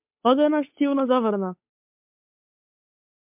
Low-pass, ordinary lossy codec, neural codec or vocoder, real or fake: 3.6 kHz; MP3, 32 kbps; codec, 16 kHz, 8 kbps, FunCodec, trained on Chinese and English, 25 frames a second; fake